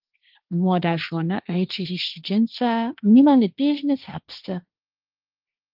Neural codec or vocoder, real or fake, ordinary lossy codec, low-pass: codec, 16 kHz, 1.1 kbps, Voila-Tokenizer; fake; Opus, 24 kbps; 5.4 kHz